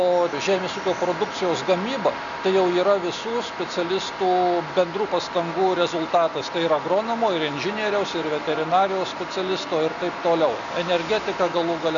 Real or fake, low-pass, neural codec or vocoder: real; 7.2 kHz; none